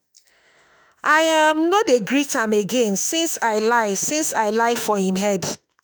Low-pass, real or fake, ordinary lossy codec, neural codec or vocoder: none; fake; none; autoencoder, 48 kHz, 32 numbers a frame, DAC-VAE, trained on Japanese speech